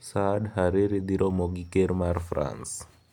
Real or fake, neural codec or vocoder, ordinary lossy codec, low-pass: real; none; none; 19.8 kHz